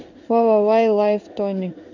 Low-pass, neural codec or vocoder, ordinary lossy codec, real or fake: 7.2 kHz; codec, 16 kHz in and 24 kHz out, 1 kbps, XY-Tokenizer; none; fake